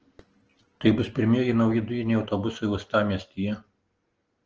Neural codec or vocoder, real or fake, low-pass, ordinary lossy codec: none; real; 7.2 kHz; Opus, 16 kbps